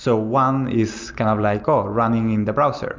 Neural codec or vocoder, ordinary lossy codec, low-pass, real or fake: none; MP3, 64 kbps; 7.2 kHz; real